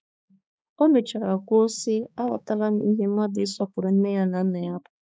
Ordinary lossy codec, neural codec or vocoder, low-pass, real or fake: none; codec, 16 kHz, 4 kbps, X-Codec, HuBERT features, trained on balanced general audio; none; fake